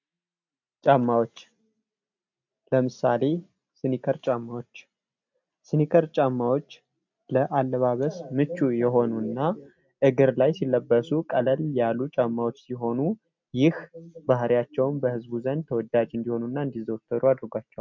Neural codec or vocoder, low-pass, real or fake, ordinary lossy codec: none; 7.2 kHz; real; MP3, 64 kbps